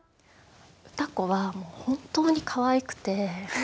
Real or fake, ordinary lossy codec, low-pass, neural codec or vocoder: real; none; none; none